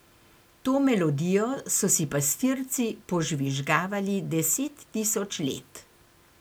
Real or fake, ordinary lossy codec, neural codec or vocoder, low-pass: real; none; none; none